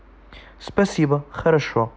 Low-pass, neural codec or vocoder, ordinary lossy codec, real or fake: none; none; none; real